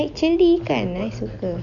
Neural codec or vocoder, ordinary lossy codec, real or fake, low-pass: none; none; real; 7.2 kHz